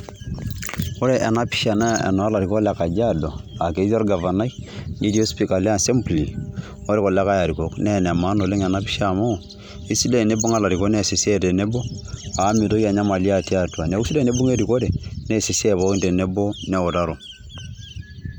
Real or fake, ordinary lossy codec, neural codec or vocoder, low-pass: real; none; none; none